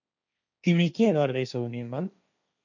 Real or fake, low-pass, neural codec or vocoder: fake; 7.2 kHz; codec, 16 kHz, 1.1 kbps, Voila-Tokenizer